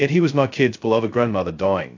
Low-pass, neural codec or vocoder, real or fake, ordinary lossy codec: 7.2 kHz; codec, 16 kHz, 0.2 kbps, FocalCodec; fake; AAC, 48 kbps